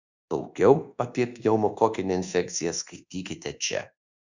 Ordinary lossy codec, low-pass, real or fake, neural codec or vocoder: Opus, 64 kbps; 7.2 kHz; fake; codec, 24 kHz, 1.2 kbps, DualCodec